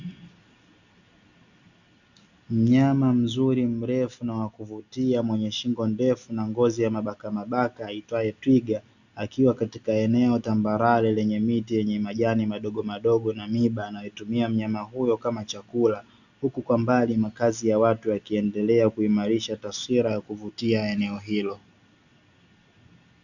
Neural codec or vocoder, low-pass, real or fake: none; 7.2 kHz; real